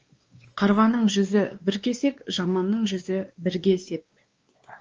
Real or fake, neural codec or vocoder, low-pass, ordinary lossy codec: fake; codec, 16 kHz, 2 kbps, X-Codec, WavLM features, trained on Multilingual LibriSpeech; 7.2 kHz; Opus, 24 kbps